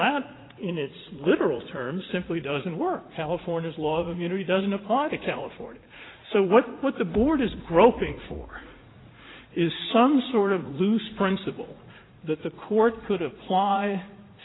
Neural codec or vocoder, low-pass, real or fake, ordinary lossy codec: vocoder, 44.1 kHz, 80 mel bands, Vocos; 7.2 kHz; fake; AAC, 16 kbps